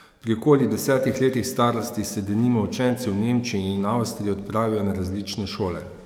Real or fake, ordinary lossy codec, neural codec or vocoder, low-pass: fake; none; codec, 44.1 kHz, 7.8 kbps, DAC; 19.8 kHz